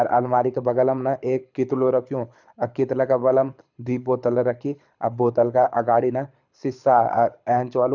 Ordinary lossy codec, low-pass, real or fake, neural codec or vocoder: none; 7.2 kHz; fake; codec, 24 kHz, 6 kbps, HILCodec